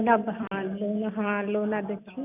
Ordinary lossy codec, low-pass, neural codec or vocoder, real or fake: none; 3.6 kHz; none; real